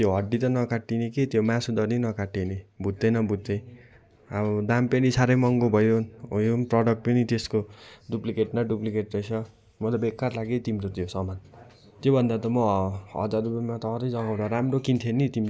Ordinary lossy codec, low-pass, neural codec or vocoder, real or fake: none; none; none; real